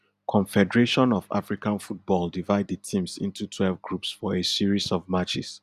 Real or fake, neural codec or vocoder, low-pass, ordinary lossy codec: real; none; 14.4 kHz; none